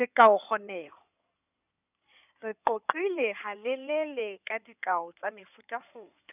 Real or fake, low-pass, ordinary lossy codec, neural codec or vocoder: fake; 3.6 kHz; none; codec, 16 kHz in and 24 kHz out, 2.2 kbps, FireRedTTS-2 codec